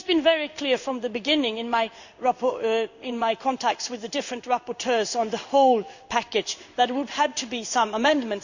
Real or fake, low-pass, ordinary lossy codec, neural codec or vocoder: fake; 7.2 kHz; none; codec, 16 kHz in and 24 kHz out, 1 kbps, XY-Tokenizer